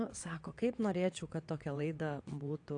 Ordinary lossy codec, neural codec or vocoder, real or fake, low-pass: AAC, 48 kbps; vocoder, 22.05 kHz, 80 mel bands, WaveNeXt; fake; 9.9 kHz